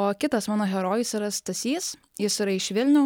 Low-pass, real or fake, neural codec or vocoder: 19.8 kHz; real; none